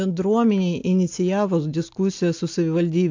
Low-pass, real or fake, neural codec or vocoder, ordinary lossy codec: 7.2 kHz; real; none; AAC, 48 kbps